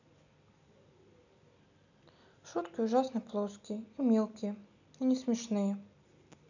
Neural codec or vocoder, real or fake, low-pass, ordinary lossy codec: none; real; 7.2 kHz; none